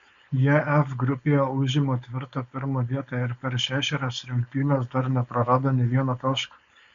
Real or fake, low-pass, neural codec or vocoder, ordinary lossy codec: fake; 7.2 kHz; codec, 16 kHz, 4.8 kbps, FACodec; MP3, 64 kbps